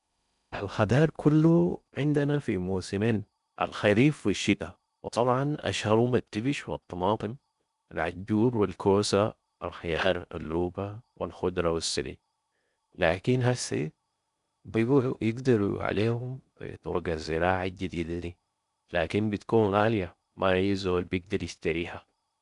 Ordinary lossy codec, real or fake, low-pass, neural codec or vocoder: none; fake; 10.8 kHz; codec, 16 kHz in and 24 kHz out, 0.6 kbps, FocalCodec, streaming, 2048 codes